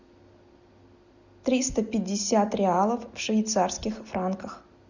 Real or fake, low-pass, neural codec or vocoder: real; 7.2 kHz; none